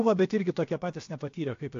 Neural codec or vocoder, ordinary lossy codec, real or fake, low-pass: codec, 16 kHz, about 1 kbps, DyCAST, with the encoder's durations; AAC, 48 kbps; fake; 7.2 kHz